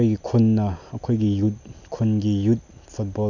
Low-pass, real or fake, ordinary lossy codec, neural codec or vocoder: 7.2 kHz; real; none; none